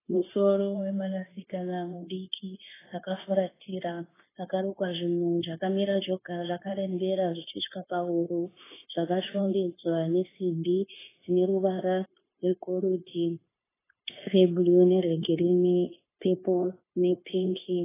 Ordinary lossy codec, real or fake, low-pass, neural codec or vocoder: AAC, 16 kbps; fake; 3.6 kHz; codec, 16 kHz, 0.9 kbps, LongCat-Audio-Codec